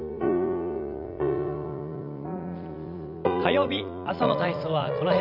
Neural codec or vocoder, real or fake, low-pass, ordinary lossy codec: vocoder, 44.1 kHz, 80 mel bands, Vocos; fake; 5.4 kHz; none